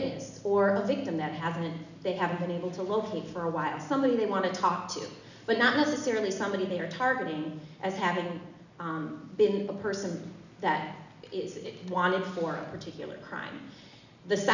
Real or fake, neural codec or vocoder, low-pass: real; none; 7.2 kHz